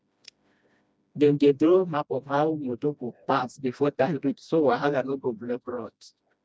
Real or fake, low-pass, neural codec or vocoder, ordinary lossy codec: fake; none; codec, 16 kHz, 1 kbps, FreqCodec, smaller model; none